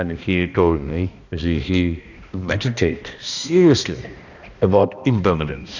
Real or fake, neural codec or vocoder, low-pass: fake; codec, 16 kHz, 1 kbps, X-Codec, HuBERT features, trained on balanced general audio; 7.2 kHz